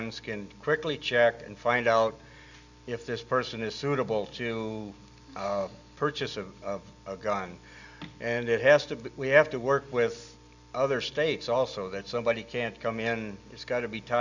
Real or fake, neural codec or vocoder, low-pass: real; none; 7.2 kHz